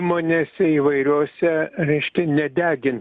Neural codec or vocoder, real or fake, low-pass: none; real; 9.9 kHz